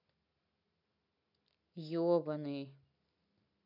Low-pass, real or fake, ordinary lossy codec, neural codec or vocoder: 5.4 kHz; real; none; none